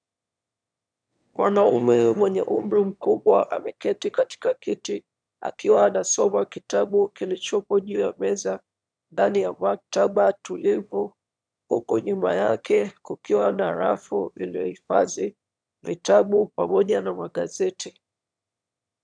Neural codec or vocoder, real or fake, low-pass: autoencoder, 22.05 kHz, a latent of 192 numbers a frame, VITS, trained on one speaker; fake; 9.9 kHz